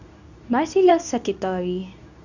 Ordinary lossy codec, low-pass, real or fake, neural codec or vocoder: none; 7.2 kHz; fake; codec, 24 kHz, 0.9 kbps, WavTokenizer, medium speech release version 2